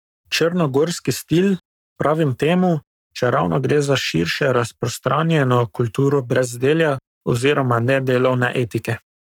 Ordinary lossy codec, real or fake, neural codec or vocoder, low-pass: none; fake; codec, 44.1 kHz, 7.8 kbps, Pupu-Codec; 19.8 kHz